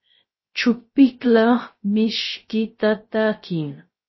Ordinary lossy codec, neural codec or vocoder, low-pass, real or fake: MP3, 24 kbps; codec, 16 kHz, 0.3 kbps, FocalCodec; 7.2 kHz; fake